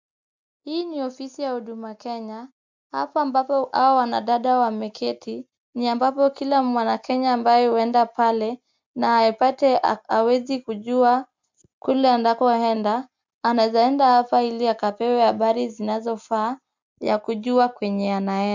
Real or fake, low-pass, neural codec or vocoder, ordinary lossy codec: real; 7.2 kHz; none; MP3, 64 kbps